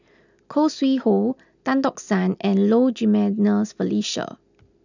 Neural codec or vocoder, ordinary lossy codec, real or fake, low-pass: none; none; real; 7.2 kHz